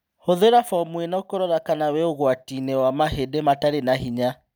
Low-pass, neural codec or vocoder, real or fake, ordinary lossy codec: none; none; real; none